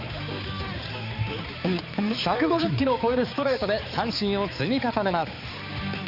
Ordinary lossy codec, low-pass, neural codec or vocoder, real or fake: Opus, 64 kbps; 5.4 kHz; codec, 16 kHz, 4 kbps, X-Codec, HuBERT features, trained on balanced general audio; fake